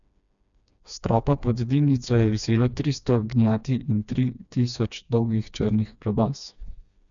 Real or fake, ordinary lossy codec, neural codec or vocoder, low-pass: fake; none; codec, 16 kHz, 2 kbps, FreqCodec, smaller model; 7.2 kHz